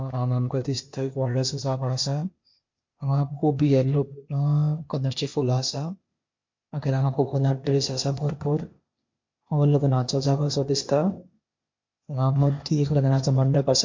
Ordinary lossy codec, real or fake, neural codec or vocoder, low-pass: MP3, 48 kbps; fake; codec, 16 kHz, 0.8 kbps, ZipCodec; 7.2 kHz